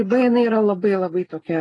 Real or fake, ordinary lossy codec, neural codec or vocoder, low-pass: real; AAC, 32 kbps; none; 10.8 kHz